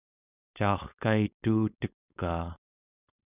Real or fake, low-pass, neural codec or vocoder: fake; 3.6 kHz; codec, 16 kHz, 4.8 kbps, FACodec